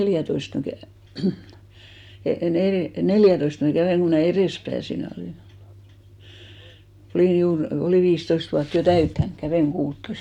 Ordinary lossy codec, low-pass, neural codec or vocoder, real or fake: none; 19.8 kHz; none; real